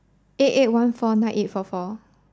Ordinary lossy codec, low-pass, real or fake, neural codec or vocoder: none; none; real; none